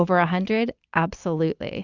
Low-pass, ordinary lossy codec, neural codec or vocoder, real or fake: 7.2 kHz; Opus, 64 kbps; none; real